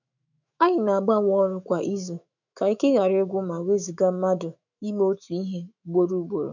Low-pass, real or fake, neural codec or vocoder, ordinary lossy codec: 7.2 kHz; fake; autoencoder, 48 kHz, 128 numbers a frame, DAC-VAE, trained on Japanese speech; none